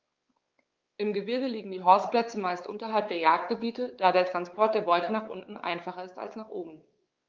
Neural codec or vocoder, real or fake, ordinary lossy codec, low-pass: codec, 16 kHz, 4 kbps, X-Codec, WavLM features, trained on Multilingual LibriSpeech; fake; Opus, 32 kbps; 7.2 kHz